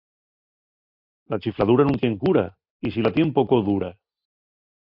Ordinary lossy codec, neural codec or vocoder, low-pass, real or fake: MP3, 48 kbps; none; 5.4 kHz; real